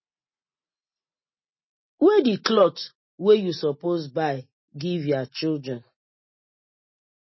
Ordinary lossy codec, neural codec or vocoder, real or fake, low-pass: MP3, 24 kbps; none; real; 7.2 kHz